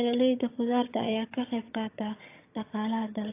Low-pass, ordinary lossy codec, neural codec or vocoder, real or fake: 3.6 kHz; AAC, 24 kbps; vocoder, 22.05 kHz, 80 mel bands, HiFi-GAN; fake